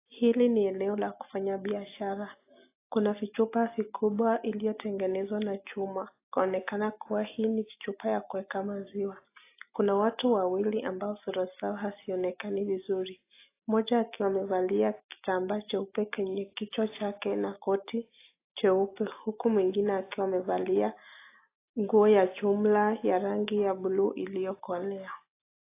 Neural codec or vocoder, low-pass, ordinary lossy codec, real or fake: none; 3.6 kHz; AAC, 24 kbps; real